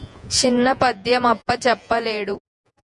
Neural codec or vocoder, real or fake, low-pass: vocoder, 48 kHz, 128 mel bands, Vocos; fake; 10.8 kHz